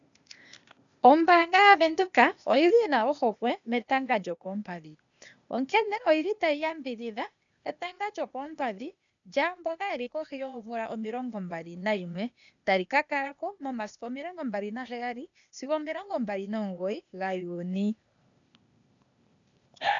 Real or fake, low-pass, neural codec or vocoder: fake; 7.2 kHz; codec, 16 kHz, 0.8 kbps, ZipCodec